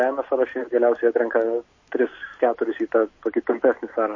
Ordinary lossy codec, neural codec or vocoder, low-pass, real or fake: MP3, 32 kbps; none; 7.2 kHz; real